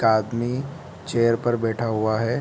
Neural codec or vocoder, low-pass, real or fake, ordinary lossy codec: none; none; real; none